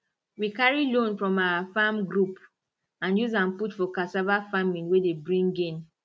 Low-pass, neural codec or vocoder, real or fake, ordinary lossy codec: none; none; real; none